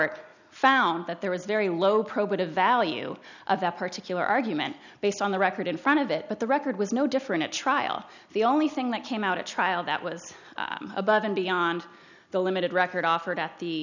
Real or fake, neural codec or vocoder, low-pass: real; none; 7.2 kHz